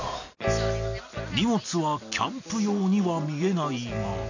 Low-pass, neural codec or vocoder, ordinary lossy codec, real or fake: 7.2 kHz; none; none; real